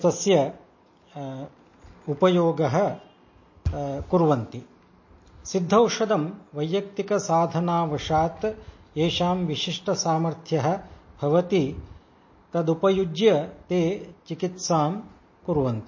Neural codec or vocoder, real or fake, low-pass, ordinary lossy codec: none; real; 7.2 kHz; MP3, 32 kbps